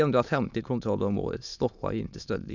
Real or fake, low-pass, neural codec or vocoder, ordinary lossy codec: fake; 7.2 kHz; autoencoder, 22.05 kHz, a latent of 192 numbers a frame, VITS, trained on many speakers; none